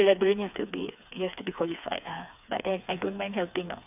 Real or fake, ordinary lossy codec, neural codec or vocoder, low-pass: fake; none; codec, 16 kHz, 4 kbps, FreqCodec, smaller model; 3.6 kHz